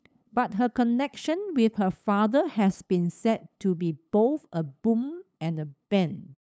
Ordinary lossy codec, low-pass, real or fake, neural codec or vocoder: none; none; fake; codec, 16 kHz, 8 kbps, FunCodec, trained on LibriTTS, 25 frames a second